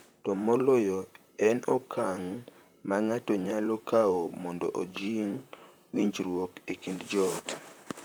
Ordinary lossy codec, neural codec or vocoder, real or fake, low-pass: none; vocoder, 44.1 kHz, 128 mel bands, Pupu-Vocoder; fake; none